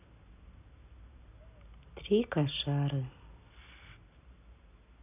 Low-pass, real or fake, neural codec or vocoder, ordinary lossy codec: 3.6 kHz; real; none; none